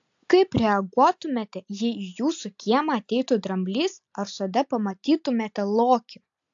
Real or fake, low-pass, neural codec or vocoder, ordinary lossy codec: real; 7.2 kHz; none; AAC, 64 kbps